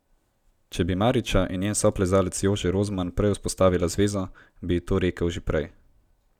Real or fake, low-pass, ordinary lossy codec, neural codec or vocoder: real; 19.8 kHz; none; none